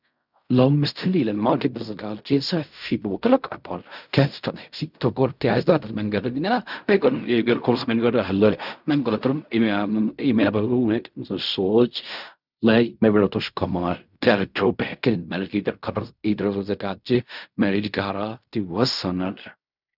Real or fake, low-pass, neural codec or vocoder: fake; 5.4 kHz; codec, 16 kHz in and 24 kHz out, 0.4 kbps, LongCat-Audio-Codec, fine tuned four codebook decoder